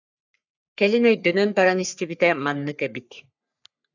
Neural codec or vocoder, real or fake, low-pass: codec, 44.1 kHz, 3.4 kbps, Pupu-Codec; fake; 7.2 kHz